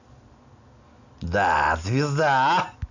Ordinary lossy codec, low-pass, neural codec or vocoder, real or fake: none; 7.2 kHz; none; real